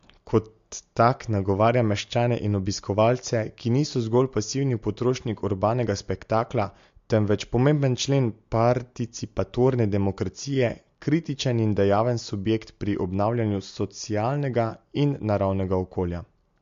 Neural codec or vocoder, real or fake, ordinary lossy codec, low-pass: none; real; MP3, 48 kbps; 7.2 kHz